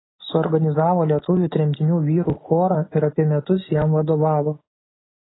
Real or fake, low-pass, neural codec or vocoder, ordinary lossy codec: real; 7.2 kHz; none; AAC, 16 kbps